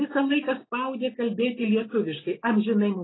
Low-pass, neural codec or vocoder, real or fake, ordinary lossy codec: 7.2 kHz; none; real; AAC, 16 kbps